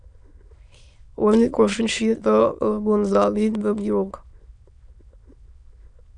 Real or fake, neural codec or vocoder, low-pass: fake; autoencoder, 22.05 kHz, a latent of 192 numbers a frame, VITS, trained on many speakers; 9.9 kHz